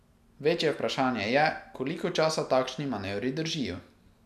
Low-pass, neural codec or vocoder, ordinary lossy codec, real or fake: 14.4 kHz; none; none; real